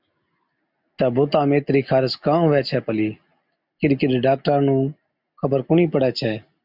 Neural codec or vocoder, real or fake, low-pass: none; real; 5.4 kHz